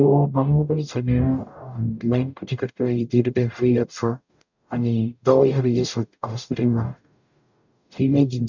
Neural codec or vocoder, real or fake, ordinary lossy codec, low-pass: codec, 44.1 kHz, 0.9 kbps, DAC; fake; none; 7.2 kHz